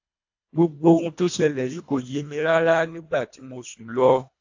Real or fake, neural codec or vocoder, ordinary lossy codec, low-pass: fake; codec, 24 kHz, 1.5 kbps, HILCodec; AAC, 48 kbps; 7.2 kHz